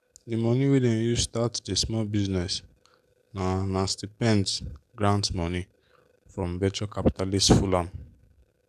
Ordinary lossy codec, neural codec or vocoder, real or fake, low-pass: none; codec, 44.1 kHz, 7.8 kbps, DAC; fake; 14.4 kHz